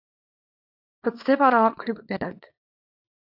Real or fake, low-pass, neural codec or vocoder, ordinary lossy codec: fake; 5.4 kHz; codec, 24 kHz, 0.9 kbps, WavTokenizer, small release; AAC, 48 kbps